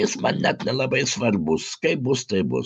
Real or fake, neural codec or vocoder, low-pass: real; none; 9.9 kHz